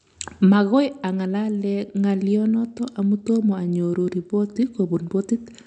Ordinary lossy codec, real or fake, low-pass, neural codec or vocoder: none; real; 9.9 kHz; none